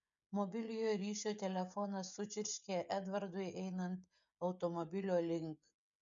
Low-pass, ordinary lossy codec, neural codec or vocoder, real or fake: 7.2 kHz; MP3, 64 kbps; codec, 16 kHz, 16 kbps, FreqCodec, smaller model; fake